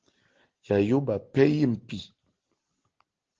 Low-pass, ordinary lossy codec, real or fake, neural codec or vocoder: 7.2 kHz; Opus, 16 kbps; real; none